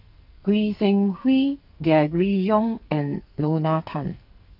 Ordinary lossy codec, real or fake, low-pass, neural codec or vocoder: none; fake; 5.4 kHz; codec, 44.1 kHz, 2.6 kbps, SNAC